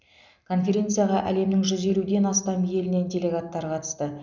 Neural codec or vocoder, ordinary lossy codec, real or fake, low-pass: none; none; real; 7.2 kHz